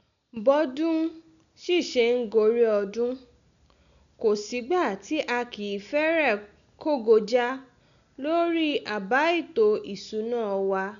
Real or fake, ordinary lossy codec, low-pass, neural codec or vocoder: real; none; 7.2 kHz; none